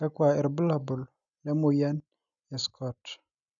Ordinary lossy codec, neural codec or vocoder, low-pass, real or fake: none; none; 7.2 kHz; real